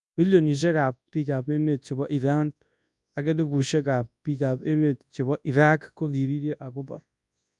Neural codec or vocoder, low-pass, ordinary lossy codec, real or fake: codec, 24 kHz, 0.9 kbps, WavTokenizer, large speech release; 10.8 kHz; none; fake